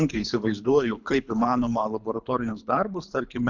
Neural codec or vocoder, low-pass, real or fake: codec, 24 kHz, 3 kbps, HILCodec; 7.2 kHz; fake